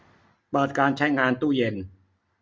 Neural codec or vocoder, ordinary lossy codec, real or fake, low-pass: none; none; real; none